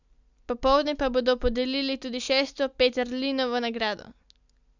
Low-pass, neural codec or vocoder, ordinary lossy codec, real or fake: 7.2 kHz; none; none; real